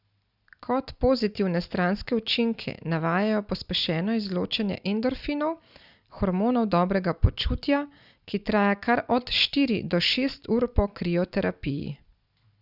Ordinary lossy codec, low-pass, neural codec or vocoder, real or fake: Opus, 64 kbps; 5.4 kHz; none; real